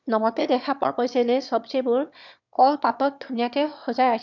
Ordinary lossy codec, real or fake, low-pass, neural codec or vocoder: none; fake; 7.2 kHz; autoencoder, 22.05 kHz, a latent of 192 numbers a frame, VITS, trained on one speaker